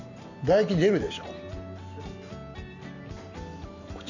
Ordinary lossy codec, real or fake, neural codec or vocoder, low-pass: none; real; none; 7.2 kHz